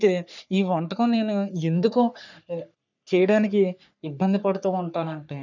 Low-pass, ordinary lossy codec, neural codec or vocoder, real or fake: 7.2 kHz; none; codec, 44.1 kHz, 3.4 kbps, Pupu-Codec; fake